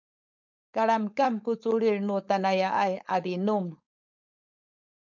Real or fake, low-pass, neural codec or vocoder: fake; 7.2 kHz; codec, 16 kHz, 4.8 kbps, FACodec